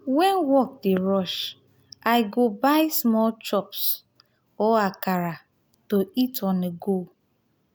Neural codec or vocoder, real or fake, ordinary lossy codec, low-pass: none; real; none; none